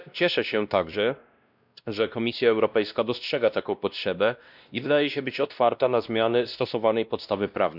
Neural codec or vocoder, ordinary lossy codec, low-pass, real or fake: codec, 16 kHz, 1 kbps, X-Codec, WavLM features, trained on Multilingual LibriSpeech; none; 5.4 kHz; fake